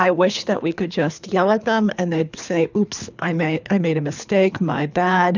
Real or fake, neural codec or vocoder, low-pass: fake; codec, 24 kHz, 3 kbps, HILCodec; 7.2 kHz